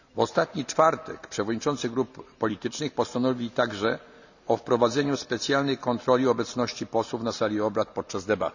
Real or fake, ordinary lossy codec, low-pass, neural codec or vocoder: real; none; 7.2 kHz; none